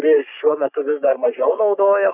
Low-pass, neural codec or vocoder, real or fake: 3.6 kHz; codec, 44.1 kHz, 3.4 kbps, Pupu-Codec; fake